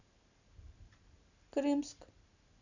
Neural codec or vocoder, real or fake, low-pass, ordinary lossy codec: none; real; 7.2 kHz; none